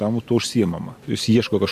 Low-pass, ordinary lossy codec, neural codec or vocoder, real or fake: 14.4 kHz; MP3, 64 kbps; none; real